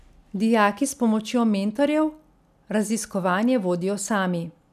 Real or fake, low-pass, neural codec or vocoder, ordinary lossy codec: real; 14.4 kHz; none; none